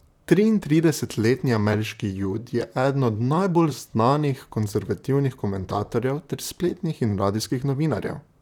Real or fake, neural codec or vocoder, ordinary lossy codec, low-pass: fake; vocoder, 44.1 kHz, 128 mel bands, Pupu-Vocoder; none; 19.8 kHz